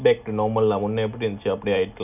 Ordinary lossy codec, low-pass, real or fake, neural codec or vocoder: none; 3.6 kHz; real; none